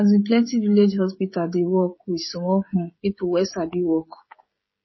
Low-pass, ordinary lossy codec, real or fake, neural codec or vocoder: 7.2 kHz; MP3, 24 kbps; fake; codec, 16 kHz, 16 kbps, FreqCodec, smaller model